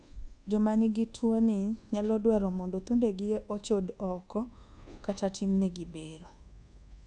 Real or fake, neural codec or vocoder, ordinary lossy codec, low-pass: fake; codec, 24 kHz, 1.2 kbps, DualCodec; none; 10.8 kHz